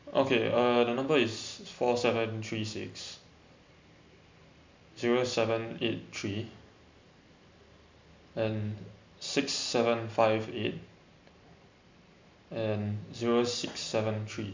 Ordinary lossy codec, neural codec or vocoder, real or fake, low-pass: MP3, 64 kbps; none; real; 7.2 kHz